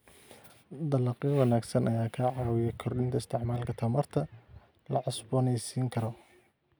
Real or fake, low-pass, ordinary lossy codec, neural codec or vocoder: real; none; none; none